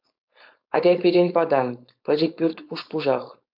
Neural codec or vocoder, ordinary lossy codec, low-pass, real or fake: codec, 16 kHz, 4.8 kbps, FACodec; MP3, 48 kbps; 5.4 kHz; fake